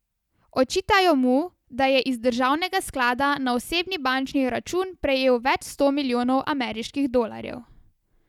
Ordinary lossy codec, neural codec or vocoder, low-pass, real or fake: none; none; 19.8 kHz; real